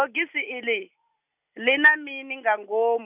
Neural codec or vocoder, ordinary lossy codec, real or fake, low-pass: none; none; real; 3.6 kHz